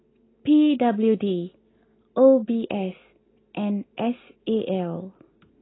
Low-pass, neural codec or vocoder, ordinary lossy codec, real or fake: 7.2 kHz; none; AAC, 16 kbps; real